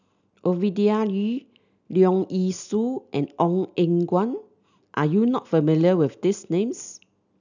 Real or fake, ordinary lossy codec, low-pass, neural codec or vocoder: real; none; 7.2 kHz; none